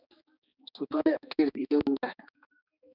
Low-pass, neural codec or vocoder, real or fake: 5.4 kHz; codec, 16 kHz, 2 kbps, X-Codec, HuBERT features, trained on balanced general audio; fake